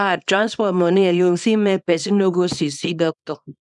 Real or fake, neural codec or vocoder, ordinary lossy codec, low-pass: fake; codec, 24 kHz, 0.9 kbps, WavTokenizer, small release; none; 10.8 kHz